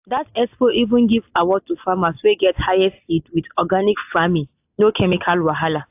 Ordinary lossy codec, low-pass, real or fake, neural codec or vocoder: AAC, 32 kbps; 3.6 kHz; real; none